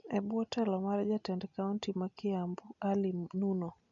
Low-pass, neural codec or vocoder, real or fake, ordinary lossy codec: 7.2 kHz; none; real; none